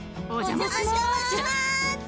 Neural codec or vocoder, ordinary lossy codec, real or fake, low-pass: none; none; real; none